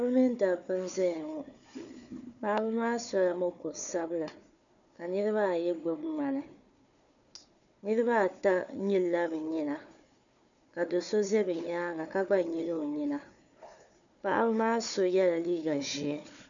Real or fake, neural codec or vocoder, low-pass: fake; codec, 16 kHz, 4 kbps, FunCodec, trained on Chinese and English, 50 frames a second; 7.2 kHz